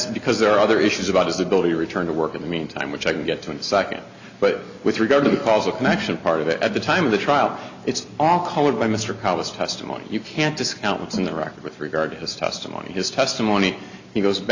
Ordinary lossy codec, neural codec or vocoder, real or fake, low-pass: Opus, 64 kbps; none; real; 7.2 kHz